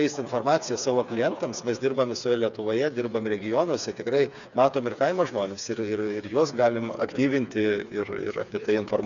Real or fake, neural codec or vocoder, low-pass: fake; codec, 16 kHz, 4 kbps, FreqCodec, smaller model; 7.2 kHz